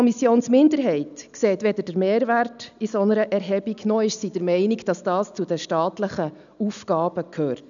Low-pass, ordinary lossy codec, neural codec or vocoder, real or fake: 7.2 kHz; none; none; real